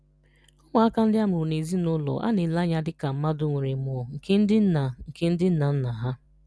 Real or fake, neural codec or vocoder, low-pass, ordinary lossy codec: real; none; none; none